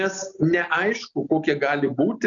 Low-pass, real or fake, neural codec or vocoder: 7.2 kHz; real; none